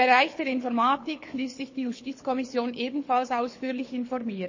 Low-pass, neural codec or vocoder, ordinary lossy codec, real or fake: 7.2 kHz; codec, 24 kHz, 6 kbps, HILCodec; MP3, 32 kbps; fake